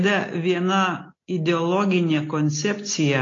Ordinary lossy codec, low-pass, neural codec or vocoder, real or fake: AAC, 32 kbps; 7.2 kHz; none; real